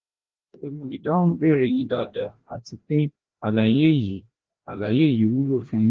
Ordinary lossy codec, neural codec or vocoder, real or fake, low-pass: Opus, 16 kbps; codec, 16 kHz, 1 kbps, FreqCodec, larger model; fake; 7.2 kHz